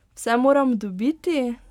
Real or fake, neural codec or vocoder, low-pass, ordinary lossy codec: real; none; 19.8 kHz; none